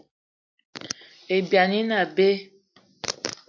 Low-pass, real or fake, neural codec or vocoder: 7.2 kHz; real; none